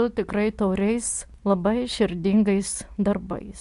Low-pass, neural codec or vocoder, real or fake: 10.8 kHz; none; real